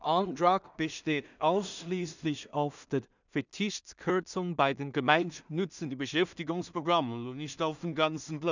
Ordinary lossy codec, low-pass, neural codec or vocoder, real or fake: none; 7.2 kHz; codec, 16 kHz in and 24 kHz out, 0.4 kbps, LongCat-Audio-Codec, two codebook decoder; fake